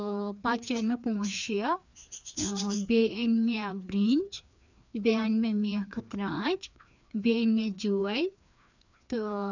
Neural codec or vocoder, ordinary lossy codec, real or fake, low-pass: codec, 16 kHz, 2 kbps, FreqCodec, larger model; none; fake; 7.2 kHz